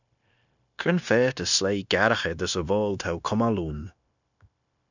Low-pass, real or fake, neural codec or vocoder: 7.2 kHz; fake; codec, 16 kHz, 0.9 kbps, LongCat-Audio-Codec